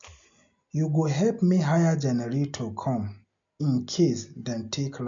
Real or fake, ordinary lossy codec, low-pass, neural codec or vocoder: real; MP3, 64 kbps; 7.2 kHz; none